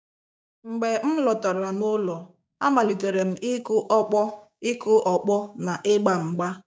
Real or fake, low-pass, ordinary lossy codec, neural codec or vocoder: fake; none; none; codec, 16 kHz, 6 kbps, DAC